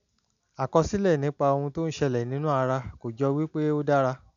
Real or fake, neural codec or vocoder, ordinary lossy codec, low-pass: real; none; MP3, 96 kbps; 7.2 kHz